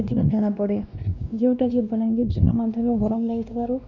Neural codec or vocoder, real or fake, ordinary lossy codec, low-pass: codec, 16 kHz, 1 kbps, X-Codec, WavLM features, trained on Multilingual LibriSpeech; fake; none; 7.2 kHz